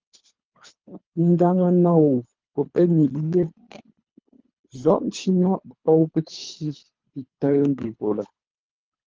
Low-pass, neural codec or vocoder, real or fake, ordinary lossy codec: 7.2 kHz; codec, 24 kHz, 3 kbps, HILCodec; fake; Opus, 24 kbps